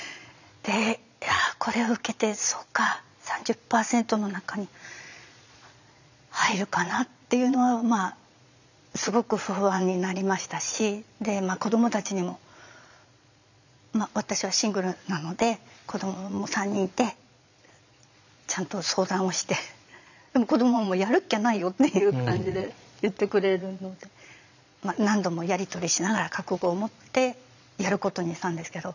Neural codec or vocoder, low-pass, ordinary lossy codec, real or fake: vocoder, 22.05 kHz, 80 mel bands, Vocos; 7.2 kHz; none; fake